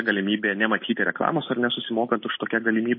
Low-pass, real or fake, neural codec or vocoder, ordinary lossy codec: 7.2 kHz; real; none; MP3, 24 kbps